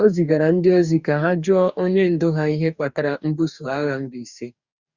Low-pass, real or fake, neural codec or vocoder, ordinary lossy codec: 7.2 kHz; fake; codec, 44.1 kHz, 2.6 kbps, DAC; Opus, 64 kbps